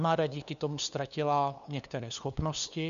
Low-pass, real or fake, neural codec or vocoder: 7.2 kHz; fake; codec, 16 kHz, 2 kbps, FunCodec, trained on LibriTTS, 25 frames a second